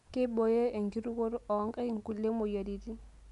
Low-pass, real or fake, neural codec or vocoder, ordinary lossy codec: 10.8 kHz; real; none; MP3, 96 kbps